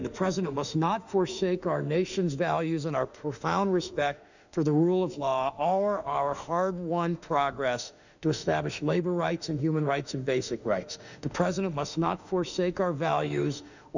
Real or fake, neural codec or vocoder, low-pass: fake; autoencoder, 48 kHz, 32 numbers a frame, DAC-VAE, trained on Japanese speech; 7.2 kHz